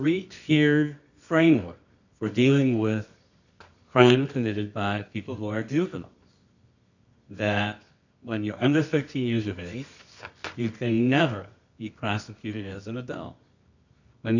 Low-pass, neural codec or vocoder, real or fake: 7.2 kHz; codec, 24 kHz, 0.9 kbps, WavTokenizer, medium music audio release; fake